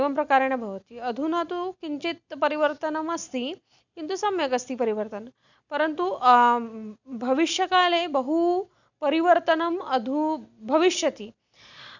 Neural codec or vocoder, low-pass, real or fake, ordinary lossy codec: none; 7.2 kHz; real; none